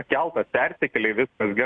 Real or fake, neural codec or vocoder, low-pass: real; none; 9.9 kHz